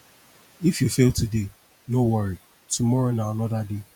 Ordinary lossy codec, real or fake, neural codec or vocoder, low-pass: none; fake; vocoder, 48 kHz, 128 mel bands, Vocos; none